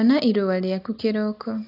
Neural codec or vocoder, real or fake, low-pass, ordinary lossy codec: none; real; 5.4 kHz; AAC, 32 kbps